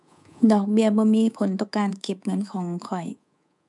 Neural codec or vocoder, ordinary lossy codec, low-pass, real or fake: codec, 24 kHz, 3.1 kbps, DualCodec; none; 10.8 kHz; fake